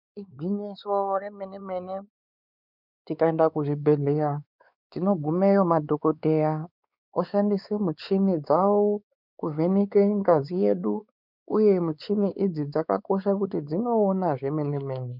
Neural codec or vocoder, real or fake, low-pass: codec, 16 kHz, 4 kbps, X-Codec, WavLM features, trained on Multilingual LibriSpeech; fake; 5.4 kHz